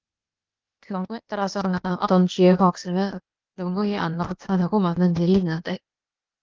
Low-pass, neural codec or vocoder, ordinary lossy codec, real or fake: 7.2 kHz; codec, 16 kHz, 0.8 kbps, ZipCodec; Opus, 32 kbps; fake